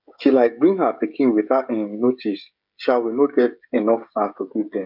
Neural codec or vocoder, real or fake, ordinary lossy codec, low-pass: codec, 16 kHz, 16 kbps, FreqCodec, smaller model; fake; none; 5.4 kHz